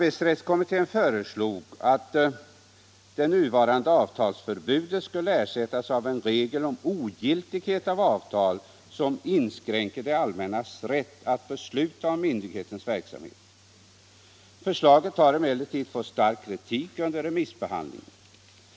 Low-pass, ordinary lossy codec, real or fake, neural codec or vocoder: none; none; real; none